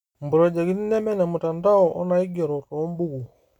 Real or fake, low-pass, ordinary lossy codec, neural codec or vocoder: real; 19.8 kHz; none; none